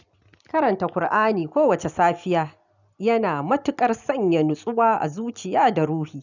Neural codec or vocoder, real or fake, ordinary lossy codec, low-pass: none; real; none; 7.2 kHz